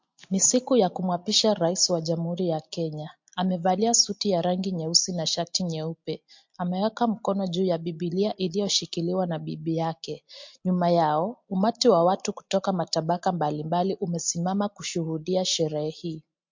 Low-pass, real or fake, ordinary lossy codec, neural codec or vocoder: 7.2 kHz; real; MP3, 48 kbps; none